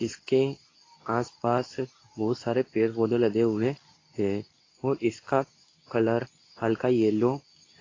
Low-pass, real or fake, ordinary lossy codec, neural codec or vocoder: 7.2 kHz; fake; AAC, 32 kbps; codec, 24 kHz, 0.9 kbps, WavTokenizer, medium speech release version 2